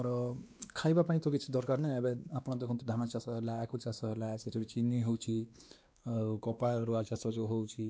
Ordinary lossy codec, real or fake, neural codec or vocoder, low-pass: none; fake; codec, 16 kHz, 2 kbps, X-Codec, WavLM features, trained on Multilingual LibriSpeech; none